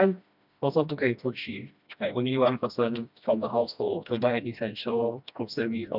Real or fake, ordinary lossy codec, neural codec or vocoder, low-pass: fake; none; codec, 16 kHz, 1 kbps, FreqCodec, smaller model; 5.4 kHz